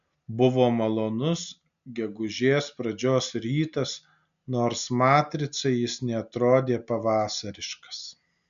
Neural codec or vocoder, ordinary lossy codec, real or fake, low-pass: none; AAC, 96 kbps; real; 7.2 kHz